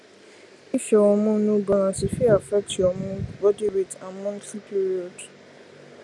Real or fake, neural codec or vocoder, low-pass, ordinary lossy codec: real; none; none; none